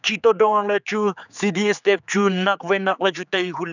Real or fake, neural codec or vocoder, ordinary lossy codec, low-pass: fake; codec, 16 kHz, 4 kbps, X-Codec, HuBERT features, trained on general audio; none; 7.2 kHz